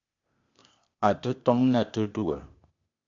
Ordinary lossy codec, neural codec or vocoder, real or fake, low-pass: AAC, 64 kbps; codec, 16 kHz, 0.8 kbps, ZipCodec; fake; 7.2 kHz